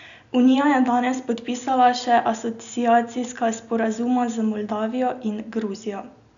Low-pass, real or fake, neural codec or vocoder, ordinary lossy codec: 7.2 kHz; real; none; none